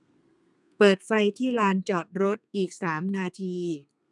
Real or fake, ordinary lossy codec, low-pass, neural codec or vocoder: fake; none; 10.8 kHz; codec, 32 kHz, 1.9 kbps, SNAC